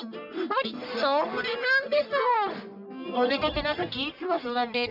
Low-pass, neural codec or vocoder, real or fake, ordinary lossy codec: 5.4 kHz; codec, 44.1 kHz, 1.7 kbps, Pupu-Codec; fake; none